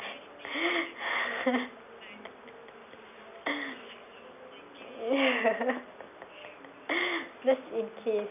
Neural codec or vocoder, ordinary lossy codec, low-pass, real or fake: none; none; 3.6 kHz; real